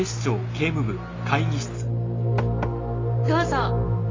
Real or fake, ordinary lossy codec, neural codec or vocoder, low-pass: real; AAC, 32 kbps; none; 7.2 kHz